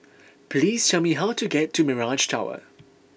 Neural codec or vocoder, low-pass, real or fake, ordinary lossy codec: none; none; real; none